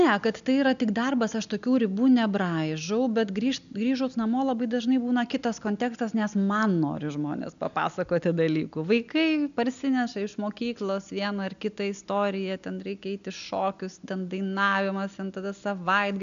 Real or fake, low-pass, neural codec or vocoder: real; 7.2 kHz; none